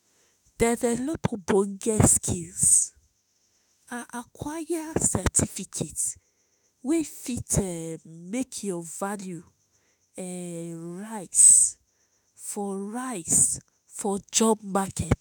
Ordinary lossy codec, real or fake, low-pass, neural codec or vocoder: none; fake; none; autoencoder, 48 kHz, 32 numbers a frame, DAC-VAE, trained on Japanese speech